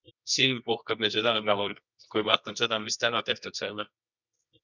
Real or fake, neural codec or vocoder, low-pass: fake; codec, 24 kHz, 0.9 kbps, WavTokenizer, medium music audio release; 7.2 kHz